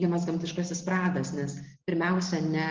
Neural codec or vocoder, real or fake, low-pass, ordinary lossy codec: none; real; 7.2 kHz; Opus, 16 kbps